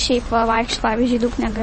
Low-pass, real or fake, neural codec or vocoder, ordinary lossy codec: 10.8 kHz; fake; vocoder, 44.1 kHz, 128 mel bands every 512 samples, BigVGAN v2; MP3, 32 kbps